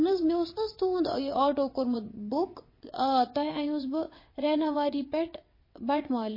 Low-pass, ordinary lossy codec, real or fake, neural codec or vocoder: 5.4 kHz; MP3, 24 kbps; fake; vocoder, 22.05 kHz, 80 mel bands, WaveNeXt